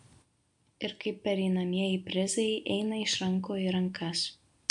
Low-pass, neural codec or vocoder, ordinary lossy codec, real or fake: 10.8 kHz; none; MP3, 64 kbps; real